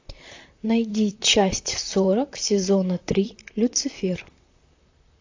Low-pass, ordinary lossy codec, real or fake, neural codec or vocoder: 7.2 kHz; AAC, 48 kbps; fake; vocoder, 44.1 kHz, 128 mel bands, Pupu-Vocoder